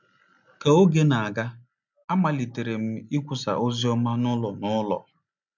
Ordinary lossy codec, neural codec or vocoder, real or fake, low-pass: none; none; real; 7.2 kHz